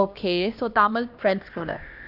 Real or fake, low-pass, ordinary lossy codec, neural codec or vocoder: fake; 5.4 kHz; AAC, 48 kbps; codec, 16 kHz, 1 kbps, X-Codec, HuBERT features, trained on LibriSpeech